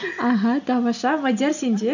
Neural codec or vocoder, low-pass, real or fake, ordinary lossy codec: none; 7.2 kHz; real; none